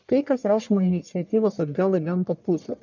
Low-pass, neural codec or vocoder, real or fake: 7.2 kHz; codec, 44.1 kHz, 1.7 kbps, Pupu-Codec; fake